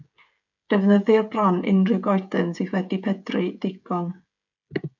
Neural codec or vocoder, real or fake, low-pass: codec, 16 kHz, 16 kbps, FreqCodec, smaller model; fake; 7.2 kHz